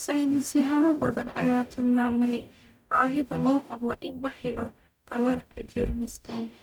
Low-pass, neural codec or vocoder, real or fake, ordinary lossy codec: 19.8 kHz; codec, 44.1 kHz, 0.9 kbps, DAC; fake; none